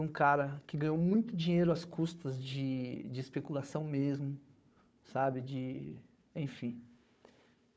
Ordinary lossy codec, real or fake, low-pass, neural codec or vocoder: none; fake; none; codec, 16 kHz, 4 kbps, FunCodec, trained on Chinese and English, 50 frames a second